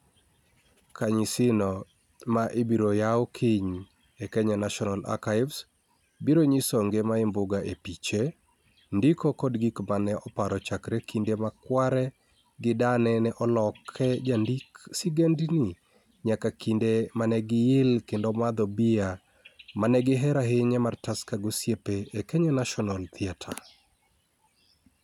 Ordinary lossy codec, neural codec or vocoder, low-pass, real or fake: none; none; 19.8 kHz; real